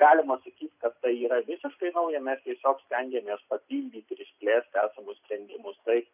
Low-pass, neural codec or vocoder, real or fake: 3.6 kHz; none; real